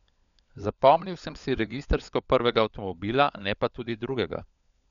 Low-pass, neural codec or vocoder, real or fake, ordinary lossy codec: 7.2 kHz; codec, 16 kHz, 16 kbps, FunCodec, trained on LibriTTS, 50 frames a second; fake; none